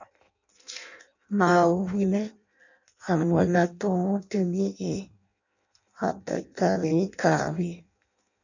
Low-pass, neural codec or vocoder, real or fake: 7.2 kHz; codec, 16 kHz in and 24 kHz out, 0.6 kbps, FireRedTTS-2 codec; fake